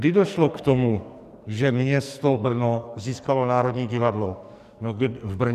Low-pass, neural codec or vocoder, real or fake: 14.4 kHz; codec, 44.1 kHz, 2.6 kbps, SNAC; fake